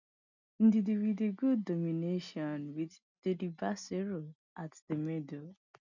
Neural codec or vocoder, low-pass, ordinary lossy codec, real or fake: none; 7.2 kHz; none; real